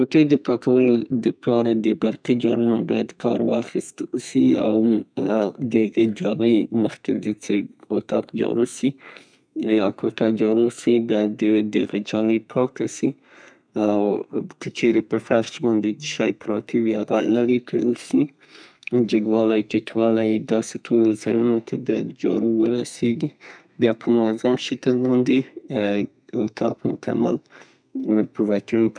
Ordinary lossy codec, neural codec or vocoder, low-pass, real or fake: none; codec, 32 kHz, 1.9 kbps, SNAC; 9.9 kHz; fake